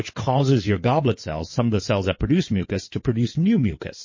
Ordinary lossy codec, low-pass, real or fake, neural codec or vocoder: MP3, 32 kbps; 7.2 kHz; fake; codec, 16 kHz in and 24 kHz out, 2.2 kbps, FireRedTTS-2 codec